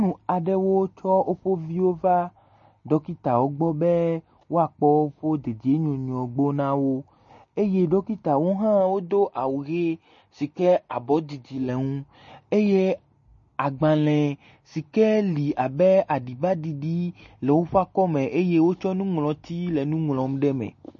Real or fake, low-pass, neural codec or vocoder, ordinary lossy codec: real; 7.2 kHz; none; MP3, 32 kbps